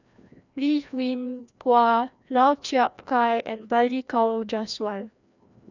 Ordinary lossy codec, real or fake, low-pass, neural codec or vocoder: none; fake; 7.2 kHz; codec, 16 kHz, 1 kbps, FreqCodec, larger model